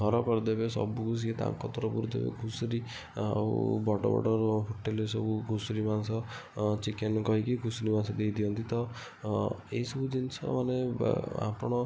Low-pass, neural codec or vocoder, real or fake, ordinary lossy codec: none; none; real; none